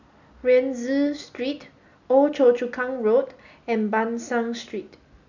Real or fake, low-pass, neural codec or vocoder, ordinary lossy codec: real; 7.2 kHz; none; none